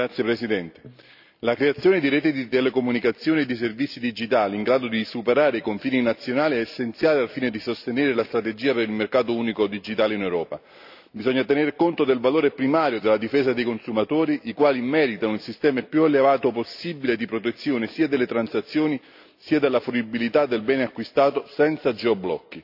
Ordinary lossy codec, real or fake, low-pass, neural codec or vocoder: AAC, 48 kbps; real; 5.4 kHz; none